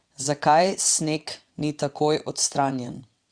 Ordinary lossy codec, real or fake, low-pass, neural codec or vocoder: Opus, 64 kbps; fake; 9.9 kHz; vocoder, 22.05 kHz, 80 mel bands, WaveNeXt